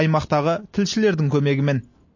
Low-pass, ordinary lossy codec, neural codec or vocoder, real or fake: 7.2 kHz; MP3, 32 kbps; none; real